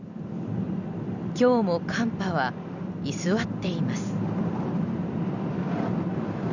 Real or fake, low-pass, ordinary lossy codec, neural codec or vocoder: fake; 7.2 kHz; none; vocoder, 44.1 kHz, 128 mel bands every 256 samples, BigVGAN v2